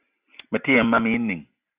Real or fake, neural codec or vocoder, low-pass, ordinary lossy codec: real; none; 3.6 kHz; AAC, 32 kbps